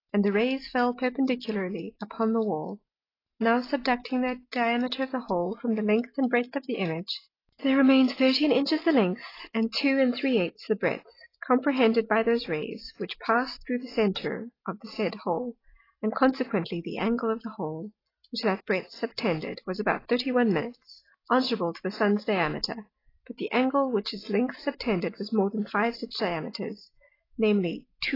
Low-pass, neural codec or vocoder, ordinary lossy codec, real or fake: 5.4 kHz; none; AAC, 24 kbps; real